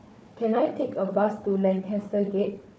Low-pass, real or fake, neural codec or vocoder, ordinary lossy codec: none; fake; codec, 16 kHz, 4 kbps, FunCodec, trained on Chinese and English, 50 frames a second; none